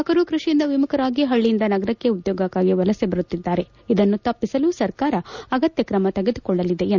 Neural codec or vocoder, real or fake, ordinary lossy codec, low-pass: none; real; none; 7.2 kHz